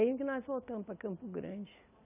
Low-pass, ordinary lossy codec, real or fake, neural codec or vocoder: 3.6 kHz; none; real; none